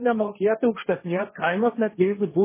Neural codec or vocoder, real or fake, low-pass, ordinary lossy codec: codec, 16 kHz, 1.1 kbps, Voila-Tokenizer; fake; 3.6 kHz; MP3, 16 kbps